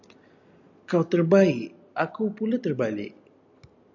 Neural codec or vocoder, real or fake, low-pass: none; real; 7.2 kHz